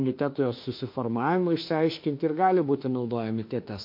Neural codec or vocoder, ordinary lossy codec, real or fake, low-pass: autoencoder, 48 kHz, 32 numbers a frame, DAC-VAE, trained on Japanese speech; MP3, 32 kbps; fake; 5.4 kHz